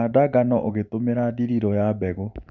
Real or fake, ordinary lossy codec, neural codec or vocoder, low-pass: fake; none; vocoder, 44.1 kHz, 128 mel bands every 512 samples, BigVGAN v2; 7.2 kHz